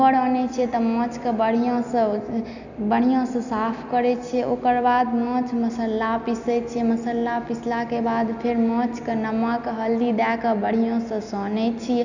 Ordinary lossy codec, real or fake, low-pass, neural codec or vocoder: none; real; 7.2 kHz; none